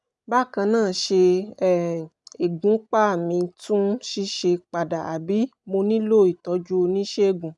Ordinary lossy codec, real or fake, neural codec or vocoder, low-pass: none; real; none; 10.8 kHz